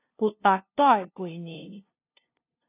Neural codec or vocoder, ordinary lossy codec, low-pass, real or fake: codec, 16 kHz, 0.5 kbps, FunCodec, trained on LibriTTS, 25 frames a second; AAC, 24 kbps; 3.6 kHz; fake